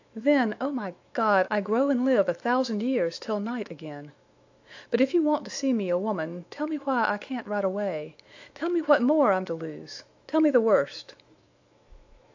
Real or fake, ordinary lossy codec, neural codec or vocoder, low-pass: fake; AAC, 48 kbps; autoencoder, 48 kHz, 128 numbers a frame, DAC-VAE, trained on Japanese speech; 7.2 kHz